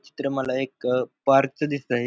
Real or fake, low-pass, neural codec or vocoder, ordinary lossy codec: real; none; none; none